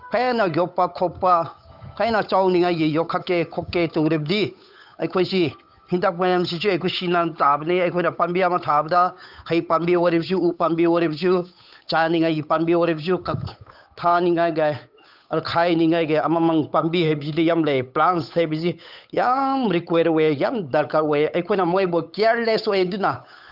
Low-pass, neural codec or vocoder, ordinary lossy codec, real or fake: 5.4 kHz; codec, 16 kHz, 8 kbps, FunCodec, trained on Chinese and English, 25 frames a second; none; fake